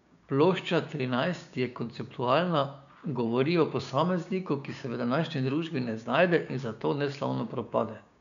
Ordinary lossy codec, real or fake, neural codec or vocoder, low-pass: none; fake; codec, 16 kHz, 6 kbps, DAC; 7.2 kHz